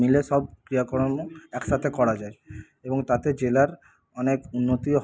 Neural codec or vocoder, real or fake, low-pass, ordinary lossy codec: none; real; none; none